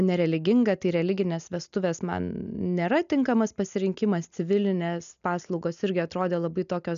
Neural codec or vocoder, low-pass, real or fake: none; 7.2 kHz; real